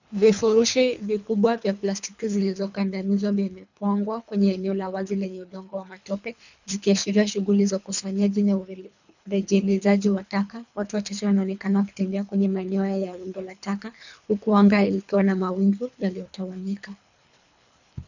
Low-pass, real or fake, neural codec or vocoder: 7.2 kHz; fake; codec, 24 kHz, 3 kbps, HILCodec